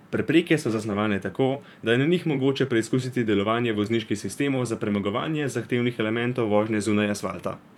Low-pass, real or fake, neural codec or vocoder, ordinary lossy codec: 19.8 kHz; fake; vocoder, 44.1 kHz, 128 mel bands, Pupu-Vocoder; none